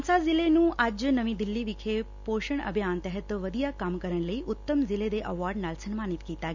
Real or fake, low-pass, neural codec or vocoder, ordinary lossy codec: real; 7.2 kHz; none; none